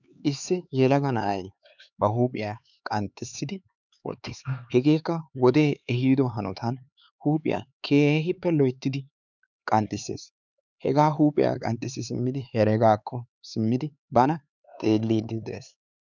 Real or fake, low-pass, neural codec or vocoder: fake; 7.2 kHz; codec, 16 kHz, 4 kbps, X-Codec, HuBERT features, trained on LibriSpeech